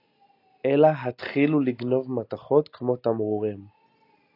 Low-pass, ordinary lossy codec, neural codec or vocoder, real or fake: 5.4 kHz; AAC, 32 kbps; none; real